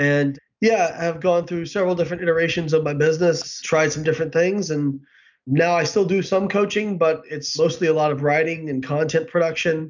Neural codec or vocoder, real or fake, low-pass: none; real; 7.2 kHz